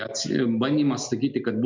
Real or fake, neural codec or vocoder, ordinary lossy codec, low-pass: real; none; MP3, 64 kbps; 7.2 kHz